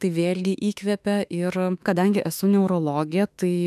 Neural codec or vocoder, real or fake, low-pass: autoencoder, 48 kHz, 32 numbers a frame, DAC-VAE, trained on Japanese speech; fake; 14.4 kHz